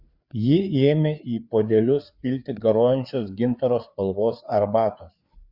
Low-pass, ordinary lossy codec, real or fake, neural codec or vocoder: 5.4 kHz; Opus, 64 kbps; fake; codec, 16 kHz, 4 kbps, FreqCodec, larger model